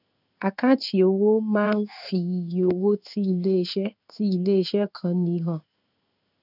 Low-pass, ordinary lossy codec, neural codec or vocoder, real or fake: 5.4 kHz; none; codec, 16 kHz in and 24 kHz out, 1 kbps, XY-Tokenizer; fake